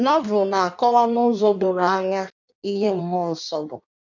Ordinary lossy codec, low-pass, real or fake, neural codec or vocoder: none; 7.2 kHz; fake; codec, 16 kHz in and 24 kHz out, 1.1 kbps, FireRedTTS-2 codec